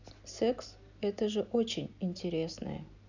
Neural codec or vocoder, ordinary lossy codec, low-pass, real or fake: none; none; 7.2 kHz; real